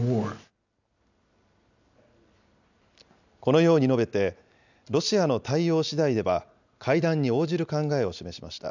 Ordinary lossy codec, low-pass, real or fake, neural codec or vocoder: none; 7.2 kHz; real; none